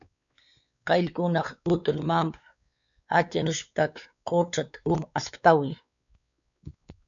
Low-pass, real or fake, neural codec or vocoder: 7.2 kHz; fake; codec, 16 kHz, 4 kbps, X-Codec, WavLM features, trained on Multilingual LibriSpeech